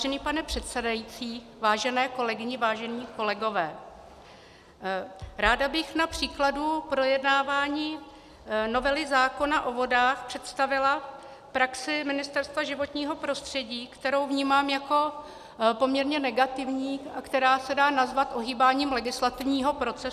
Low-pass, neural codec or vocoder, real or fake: 14.4 kHz; none; real